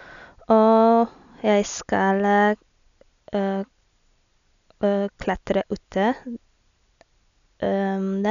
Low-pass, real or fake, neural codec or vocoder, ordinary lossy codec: 7.2 kHz; real; none; none